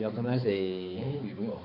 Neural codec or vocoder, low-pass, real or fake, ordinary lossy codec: codec, 16 kHz, 4 kbps, X-Codec, HuBERT features, trained on balanced general audio; 5.4 kHz; fake; none